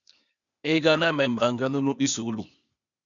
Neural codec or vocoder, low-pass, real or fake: codec, 16 kHz, 0.8 kbps, ZipCodec; 7.2 kHz; fake